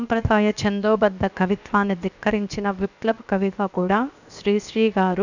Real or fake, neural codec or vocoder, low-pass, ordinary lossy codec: fake; codec, 16 kHz, 0.7 kbps, FocalCodec; 7.2 kHz; none